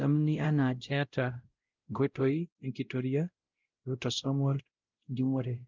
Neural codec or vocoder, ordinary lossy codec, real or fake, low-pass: codec, 16 kHz, 0.5 kbps, X-Codec, WavLM features, trained on Multilingual LibriSpeech; Opus, 24 kbps; fake; 7.2 kHz